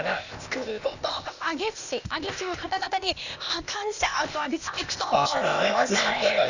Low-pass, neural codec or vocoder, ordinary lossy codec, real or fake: 7.2 kHz; codec, 16 kHz, 0.8 kbps, ZipCodec; none; fake